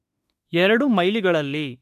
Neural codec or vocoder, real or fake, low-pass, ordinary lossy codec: autoencoder, 48 kHz, 32 numbers a frame, DAC-VAE, trained on Japanese speech; fake; 14.4 kHz; MP3, 64 kbps